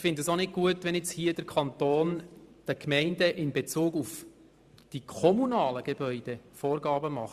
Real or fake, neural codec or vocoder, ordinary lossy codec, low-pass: fake; vocoder, 44.1 kHz, 128 mel bands every 512 samples, BigVGAN v2; Opus, 64 kbps; 14.4 kHz